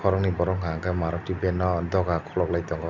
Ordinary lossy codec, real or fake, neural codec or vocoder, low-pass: none; real; none; 7.2 kHz